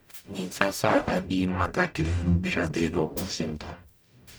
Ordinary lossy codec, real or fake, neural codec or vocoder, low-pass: none; fake; codec, 44.1 kHz, 0.9 kbps, DAC; none